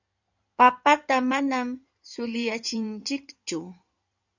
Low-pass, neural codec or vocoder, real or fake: 7.2 kHz; codec, 16 kHz in and 24 kHz out, 2.2 kbps, FireRedTTS-2 codec; fake